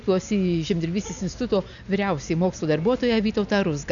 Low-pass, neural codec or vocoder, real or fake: 7.2 kHz; none; real